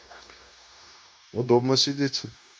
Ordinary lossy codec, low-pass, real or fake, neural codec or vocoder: none; none; fake; codec, 16 kHz, 0.9 kbps, LongCat-Audio-Codec